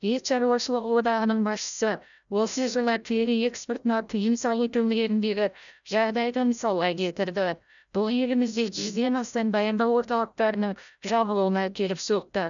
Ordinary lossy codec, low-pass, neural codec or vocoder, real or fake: none; 7.2 kHz; codec, 16 kHz, 0.5 kbps, FreqCodec, larger model; fake